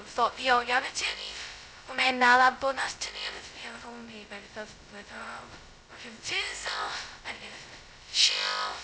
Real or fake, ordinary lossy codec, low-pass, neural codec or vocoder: fake; none; none; codec, 16 kHz, 0.2 kbps, FocalCodec